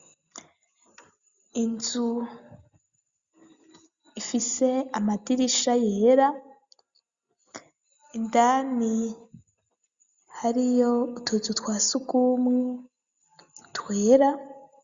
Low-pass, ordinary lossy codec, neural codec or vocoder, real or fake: 7.2 kHz; Opus, 64 kbps; none; real